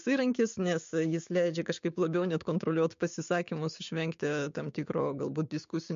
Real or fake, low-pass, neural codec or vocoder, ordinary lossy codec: fake; 7.2 kHz; codec, 16 kHz, 6 kbps, DAC; MP3, 48 kbps